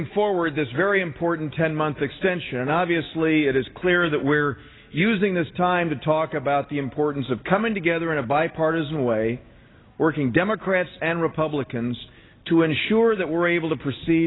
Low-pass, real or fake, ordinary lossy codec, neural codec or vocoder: 7.2 kHz; real; AAC, 16 kbps; none